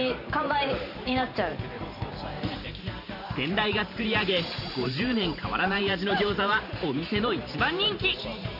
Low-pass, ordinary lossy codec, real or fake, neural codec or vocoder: 5.4 kHz; none; fake; vocoder, 44.1 kHz, 128 mel bands every 512 samples, BigVGAN v2